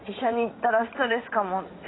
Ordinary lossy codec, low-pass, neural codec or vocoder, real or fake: AAC, 16 kbps; 7.2 kHz; vocoder, 44.1 kHz, 80 mel bands, Vocos; fake